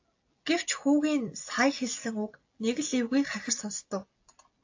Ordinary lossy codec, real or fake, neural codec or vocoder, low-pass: AAC, 48 kbps; real; none; 7.2 kHz